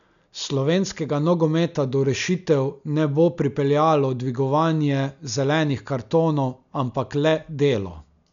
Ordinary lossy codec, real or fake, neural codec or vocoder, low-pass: none; real; none; 7.2 kHz